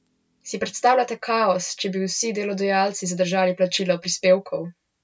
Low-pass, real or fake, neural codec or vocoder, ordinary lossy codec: none; real; none; none